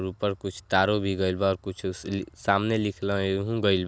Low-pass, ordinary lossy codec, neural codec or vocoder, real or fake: none; none; none; real